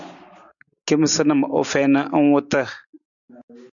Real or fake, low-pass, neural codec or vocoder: real; 7.2 kHz; none